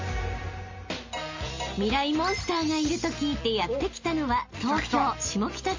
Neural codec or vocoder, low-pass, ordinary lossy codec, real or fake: none; 7.2 kHz; MP3, 32 kbps; real